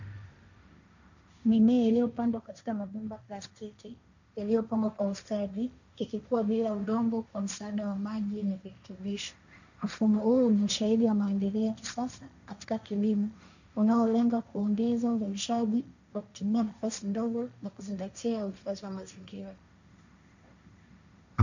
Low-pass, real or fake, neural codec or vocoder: 7.2 kHz; fake; codec, 16 kHz, 1.1 kbps, Voila-Tokenizer